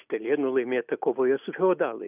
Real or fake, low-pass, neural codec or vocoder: real; 3.6 kHz; none